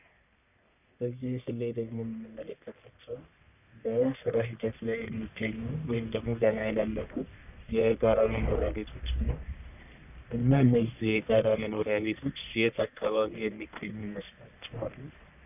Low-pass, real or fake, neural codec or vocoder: 3.6 kHz; fake; codec, 44.1 kHz, 1.7 kbps, Pupu-Codec